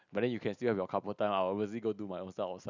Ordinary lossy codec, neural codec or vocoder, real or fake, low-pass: none; none; real; 7.2 kHz